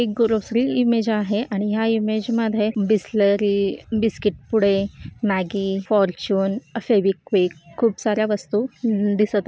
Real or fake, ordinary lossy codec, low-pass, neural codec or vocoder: real; none; none; none